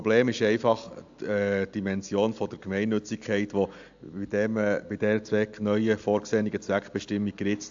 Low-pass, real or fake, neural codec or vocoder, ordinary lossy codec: 7.2 kHz; real; none; AAC, 64 kbps